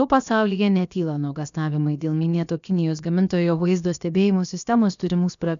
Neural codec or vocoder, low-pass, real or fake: codec, 16 kHz, about 1 kbps, DyCAST, with the encoder's durations; 7.2 kHz; fake